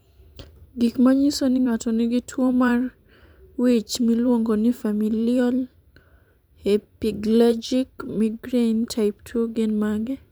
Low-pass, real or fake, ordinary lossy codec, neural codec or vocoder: none; fake; none; vocoder, 44.1 kHz, 128 mel bands every 512 samples, BigVGAN v2